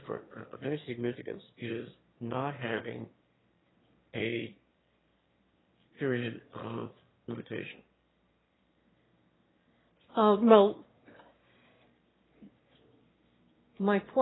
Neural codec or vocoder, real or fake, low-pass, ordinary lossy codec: autoencoder, 22.05 kHz, a latent of 192 numbers a frame, VITS, trained on one speaker; fake; 7.2 kHz; AAC, 16 kbps